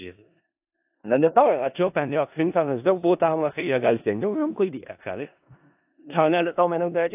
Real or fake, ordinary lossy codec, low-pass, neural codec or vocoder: fake; AAC, 32 kbps; 3.6 kHz; codec, 16 kHz in and 24 kHz out, 0.4 kbps, LongCat-Audio-Codec, four codebook decoder